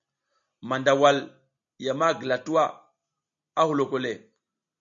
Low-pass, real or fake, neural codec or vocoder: 7.2 kHz; real; none